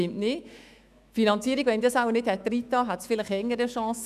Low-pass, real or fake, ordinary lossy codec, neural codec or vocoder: 14.4 kHz; fake; none; autoencoder, 48 kHz, 128 numbers a frame, DAC-VAE, trained on Japanese speech